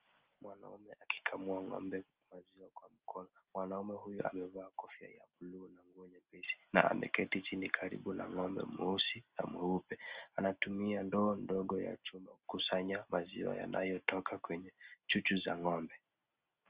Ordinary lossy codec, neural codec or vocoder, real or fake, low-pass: Opus, 24 kbps; none; real; 3.6 kHz